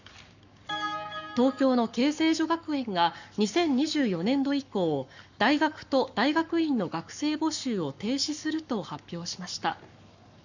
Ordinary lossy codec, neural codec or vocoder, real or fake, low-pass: none; codec, 44.1 kHz, 7.8 kbps, Pupu-Codec; fake; 7.2 kHz